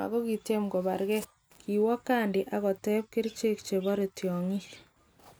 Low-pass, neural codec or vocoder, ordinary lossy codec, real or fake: none; none; none; real